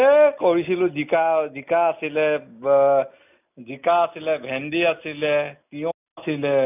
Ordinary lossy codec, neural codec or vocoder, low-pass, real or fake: none; none; 3.6 kHz; real